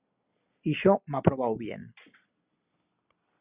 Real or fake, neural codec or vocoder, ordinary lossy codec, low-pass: real; none; Opus, 64 kbps; 3.6 kHz